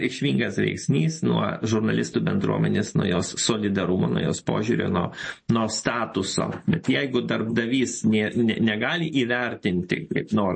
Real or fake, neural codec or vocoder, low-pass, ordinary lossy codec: real; none; 9.9 kHz; MP3, 32 kbps